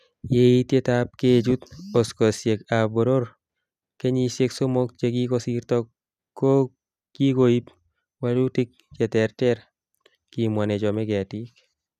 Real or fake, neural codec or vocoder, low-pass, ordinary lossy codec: real; none; 14.4 kHz; none